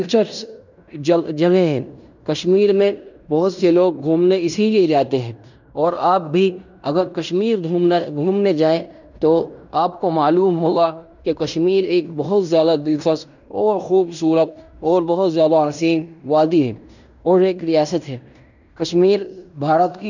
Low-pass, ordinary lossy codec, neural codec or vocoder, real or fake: 7.2 kHz; none; codec, 16 kHz in and 24 kHz out, 0.9 kbps, LongCat-Audio-Codec, fine tuned four codebook decoder; fake